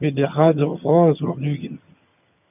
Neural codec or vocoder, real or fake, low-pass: vocoder, 22.05 kHz, 80 mel bands, HiFi-GAN; fake; 3.6 kHz